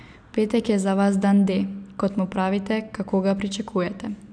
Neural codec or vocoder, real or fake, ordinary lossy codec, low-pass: none; real; none; 9.9 kHz